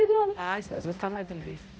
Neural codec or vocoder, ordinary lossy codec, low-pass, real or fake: codec, 16 kHz, 0.5 kbps, X-Codec, HuBERT features, trained on balanced general audio; none; none; fake